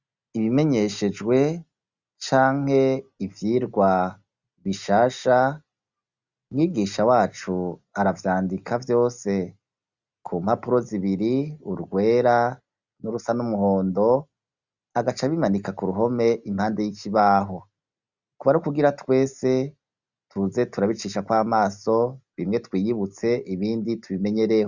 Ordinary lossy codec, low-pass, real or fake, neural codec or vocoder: Opus, 64 kbps; 7.2 kHz; real; none